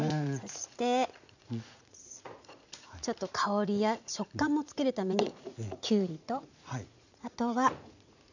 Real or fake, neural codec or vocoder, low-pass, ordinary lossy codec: fake; vocoder, 44.1 kHz, 80 mel bands, Vocos; 7.2 kHz; none